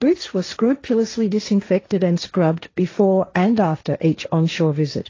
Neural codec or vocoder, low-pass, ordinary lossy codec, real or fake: codec, 16 kHz, 1.1 kbps, Voila-Tokenizer; 7.2 kHz; AAC, 32 kbps; fake